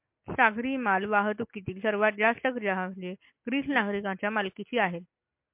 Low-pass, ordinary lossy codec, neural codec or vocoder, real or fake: 3.6 kHz; MP3, 32 kbps; none; real